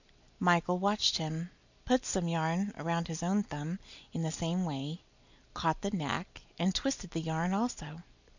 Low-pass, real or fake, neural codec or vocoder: 7.2 kHz; real; none